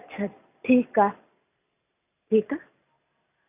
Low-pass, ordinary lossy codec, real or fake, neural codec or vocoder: 3.6 kHz; none; real; none